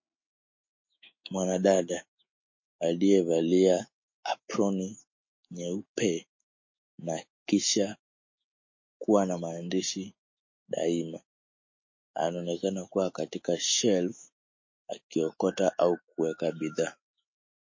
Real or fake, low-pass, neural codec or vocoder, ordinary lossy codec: fake; 7.2 kHz; autoencoder, 48 kHz, 128 numbers a frame, DAC-VAE, trained on Japanese speech; MP3, 32 kbps